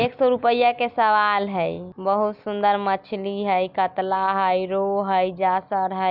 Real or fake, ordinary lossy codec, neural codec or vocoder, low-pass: real; none; none; 5.4 kHz